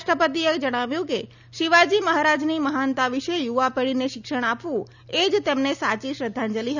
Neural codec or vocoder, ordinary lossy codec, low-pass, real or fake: none; none; 7.2 kHz; real